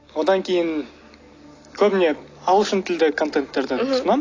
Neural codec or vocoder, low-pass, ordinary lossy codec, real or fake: none; 7.2 kHz; AAC, 32 kbps; real